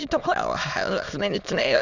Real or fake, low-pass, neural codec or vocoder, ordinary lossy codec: fake; 7.2 kHz; autoencoder, 22.05 kHz, a latent of 192 numbers a frame, VITS, trained on many speakers; none